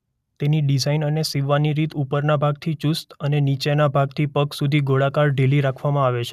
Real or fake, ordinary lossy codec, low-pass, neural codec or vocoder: real; none; 14.4 kHz; none